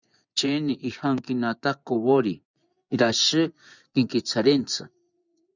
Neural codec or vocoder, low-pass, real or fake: vocoder, 24 kHz, 100 mel bands, Vocos; 7.2 kHz; fake